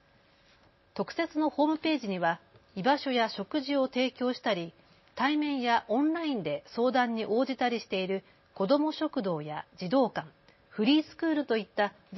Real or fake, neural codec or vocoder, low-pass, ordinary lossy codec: real; none; 7.2 kHz; MP3, 24 kbps